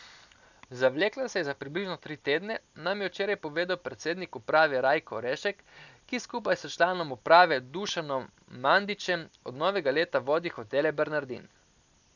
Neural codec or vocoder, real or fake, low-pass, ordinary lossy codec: none; real; 7.2 kHz; none